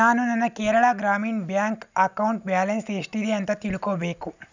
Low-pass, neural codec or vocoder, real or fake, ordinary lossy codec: 7.2 kHz; none; real; none